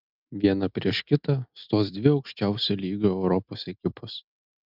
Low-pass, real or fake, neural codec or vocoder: 5.4 kHz; real; none